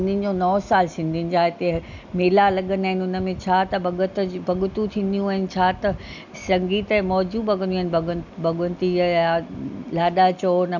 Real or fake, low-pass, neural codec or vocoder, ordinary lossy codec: real; 7.2 kHz; none; none